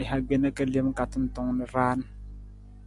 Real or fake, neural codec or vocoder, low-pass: real; none; 10.8 kHz